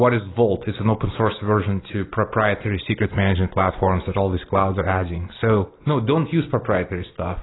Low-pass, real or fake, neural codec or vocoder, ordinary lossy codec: 7.2 kHz; real; none; AAC, 16 kbps